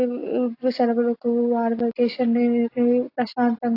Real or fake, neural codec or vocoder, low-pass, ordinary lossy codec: real; none; 5.4 kHz; AAC, 32 kbps